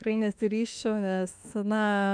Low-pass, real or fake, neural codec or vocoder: 9.9 kHz; fake; autoencoder, 48 kHz, 32 numbers a frame, DAC-VAE, trained on Japanese speech